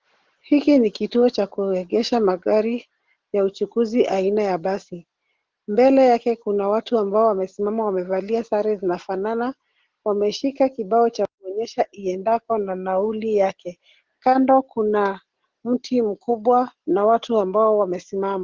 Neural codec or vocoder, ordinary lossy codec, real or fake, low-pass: none; Opus, 16 kbps; real; 7.2 kHz